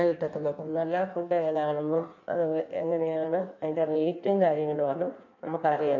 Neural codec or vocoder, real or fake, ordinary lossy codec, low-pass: codec, 16 kHz in and 24 kHz out, 1.1 kbps, FireRedTTS-2 codec; fake; none; 7.2 kHz